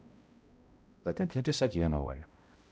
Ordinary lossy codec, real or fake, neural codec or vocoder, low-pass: none; fake; codec, 16 kHz, 0.5 kbps, X-Codec, HuBERT features, trained on balanced general audio; none